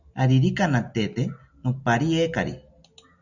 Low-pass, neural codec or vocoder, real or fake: 7.2 kHz; none; real